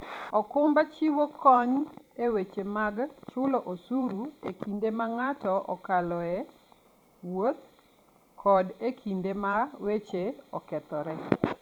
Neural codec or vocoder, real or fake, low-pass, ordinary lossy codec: vocoder, 44.1 kHz, 128 mel bands every 512 samples, BigVGAN v2; fake; 19.8 kHz; none